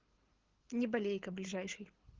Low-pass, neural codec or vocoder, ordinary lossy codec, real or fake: 7.2 kHz; codec, 24 kHz, 6 kbps, HILCodec; Opus, 16 kbps; fake